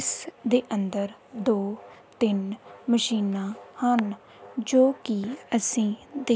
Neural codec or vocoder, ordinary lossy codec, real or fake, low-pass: none; none; real; none